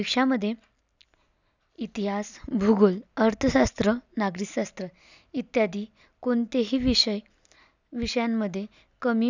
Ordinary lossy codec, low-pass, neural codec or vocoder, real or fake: none; 7.2 kHz; none; real